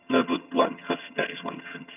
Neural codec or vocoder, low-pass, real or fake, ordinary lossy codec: vocoder, 22.05 kHz, 80 mel bands, HiFi-GAN; 3.6 kHz; fake; none